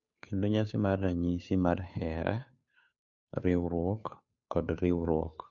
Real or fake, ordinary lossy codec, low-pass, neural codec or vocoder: fake; MP3, 48 kbps; 7.2 kHz; codec, 16 kHz, 2 kbps, FunCodec, trained on Chinese and English, 25 frames a second